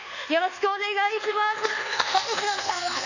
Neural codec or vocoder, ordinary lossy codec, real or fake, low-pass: codec, 24 kHz, 1.2 kbps, DualCodec; none; fake; 7.2 kHz